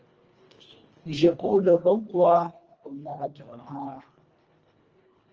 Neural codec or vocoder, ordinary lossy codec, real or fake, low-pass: codec, 24 kHz, 1.5 kbps, HILCodec; Opus, 24 kbps; fake; 7.2 kHz